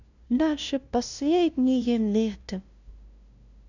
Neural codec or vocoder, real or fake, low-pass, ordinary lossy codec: codec, 16 kHz, 0.5 kbps, FunCodec, trained on LibriTTS, 25 frames a second; fake; 7.2 kHz; none